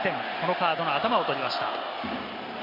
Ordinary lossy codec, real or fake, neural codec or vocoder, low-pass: MP3, 32 kbps; real; none; 5.4 kHz